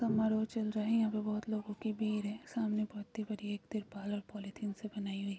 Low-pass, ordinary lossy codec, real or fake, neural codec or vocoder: none; none; real; none